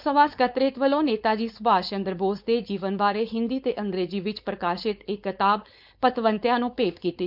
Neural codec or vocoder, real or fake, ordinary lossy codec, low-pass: codec, 16 kHz, 4.8 kbps, FACodec; fake; none; 5.4 kHz